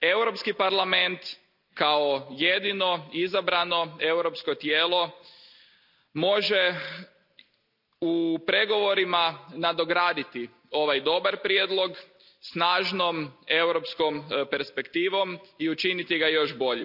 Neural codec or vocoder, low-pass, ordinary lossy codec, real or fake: none; 5.4 kHz; none; real